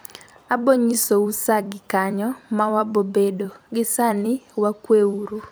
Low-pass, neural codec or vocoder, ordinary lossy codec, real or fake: none; vocoder, 44.1 kHz, 128 mel bands every 256 samples, BigVGAN v2; none; fake